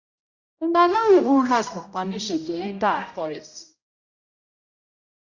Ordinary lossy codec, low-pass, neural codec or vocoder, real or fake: Opus, 64 kbps; 7.2 kHz; codec, 16 kHz, 0.5 kbps, X-Codec, HuBERT features, trained on general audio; fake